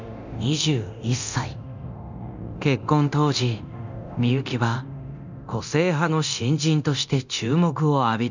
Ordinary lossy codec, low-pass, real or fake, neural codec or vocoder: none; 7.2 kHz; fake; codec, 24 kHz, 0.9 kbps, DualCodec